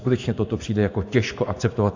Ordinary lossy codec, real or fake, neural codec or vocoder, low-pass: AAC, 48 kbps; fake; vocoder, 24 kHz, 100 mel bands, Vocos; 7.2 kHz